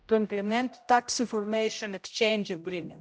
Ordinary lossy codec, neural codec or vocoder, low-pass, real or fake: none; codec, 16 kHz, 0.5 kbps, X-Codec, HuBERT features, trained on general audio; none; fake